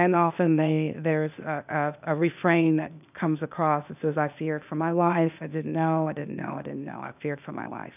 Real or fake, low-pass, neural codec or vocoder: fake; 3.6 kHz; codec, 16 kHz, 0.8 kbps, ZipCodec